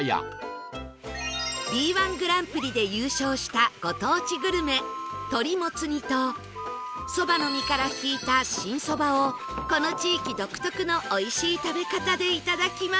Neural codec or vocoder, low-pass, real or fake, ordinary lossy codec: none; none; real; none